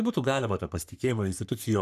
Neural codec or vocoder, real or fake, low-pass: codec, 44.1 kHz, 3.4 kbps, Pupu-Codec; fake; 14.4 kHz